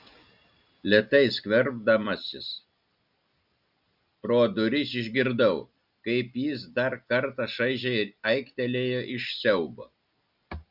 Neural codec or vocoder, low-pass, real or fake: none; 5.4 kHz; real